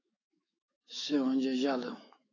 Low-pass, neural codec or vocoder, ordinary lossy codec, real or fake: 7.2 kHz; autoencoder, 48 kHz, 128 numbers a frame, DAC-VAE, trained on Japanese speech; AAC, 32 kbps; fake